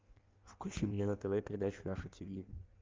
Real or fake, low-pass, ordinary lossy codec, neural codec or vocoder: fake; 7.2 kHz; Opus, 32 kbps; codec, 16 kHz in and 24 kHz out, 1.1 kbps, FireRedTTS-2 codec